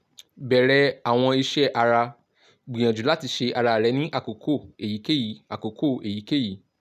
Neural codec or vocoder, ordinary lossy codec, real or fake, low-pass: none; none; real; 14.4 kHz